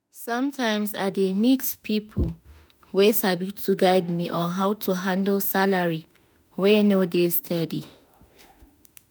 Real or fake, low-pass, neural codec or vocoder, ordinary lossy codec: fake; none; autoencoder, 48 kHz, 32 numbers a frame, DAC-VAE, trained on Japanese speech; none